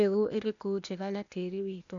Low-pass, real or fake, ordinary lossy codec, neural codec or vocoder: 7.2 kHz; fake; none; codec, 16 kHz, 0.8 kbps, ZipCodec